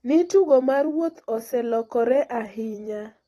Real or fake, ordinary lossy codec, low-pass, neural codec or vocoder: fake; AAC, 32 kbps; 19.8 kHz; vocoder, 44.1 kHz, 128 mel bands every 256 samples, BigVGAN v2